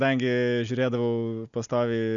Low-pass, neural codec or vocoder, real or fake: 7.2 kHz; none; real